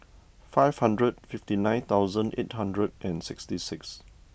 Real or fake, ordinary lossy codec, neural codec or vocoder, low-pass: real; none; none; none